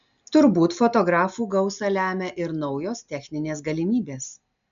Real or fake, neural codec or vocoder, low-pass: real; none; 7.2 kHz